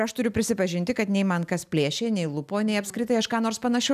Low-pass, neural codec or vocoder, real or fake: 14.4 kHz; none; real